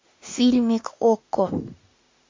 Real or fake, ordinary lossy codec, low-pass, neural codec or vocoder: fake; MP3, 48 kbps; 7.2 kHz; autoencoder, 48 kHz, 32 numbers a frame, DAC-VAE, trained on Japanese speech